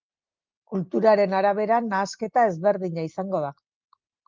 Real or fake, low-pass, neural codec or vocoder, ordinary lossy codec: real; 7.2 kHz; none; Opus, 32 kbps